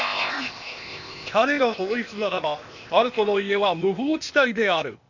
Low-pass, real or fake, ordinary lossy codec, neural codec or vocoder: 7.2 kHz; fake; none; codec, 16 kHz, 0.8 kbps, ZipCodec